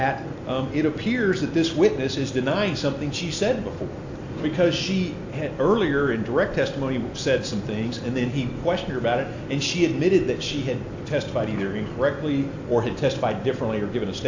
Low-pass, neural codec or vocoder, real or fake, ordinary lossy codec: 7.2 kHz; none; real; AAC, 48 kbps